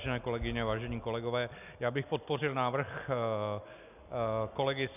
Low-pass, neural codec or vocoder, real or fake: 3.6 kHz; none; real